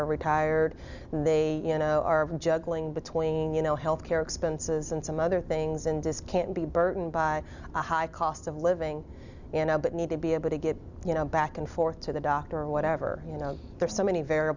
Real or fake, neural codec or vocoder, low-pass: real; none; 7.2 kHz